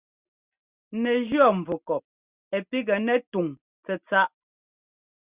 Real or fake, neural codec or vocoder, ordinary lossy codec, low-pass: real; none; Opus, 64 kbps; 3.6 kHz